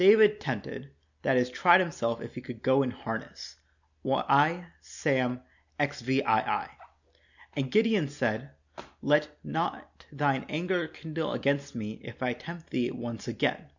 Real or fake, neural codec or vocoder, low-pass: real; none; 7.2 kHz